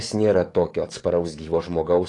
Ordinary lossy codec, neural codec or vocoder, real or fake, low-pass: AAC, 48 kbps; codec, 44.1 kHz, 7.8 kbps, DAC; fake; 10.8 kHz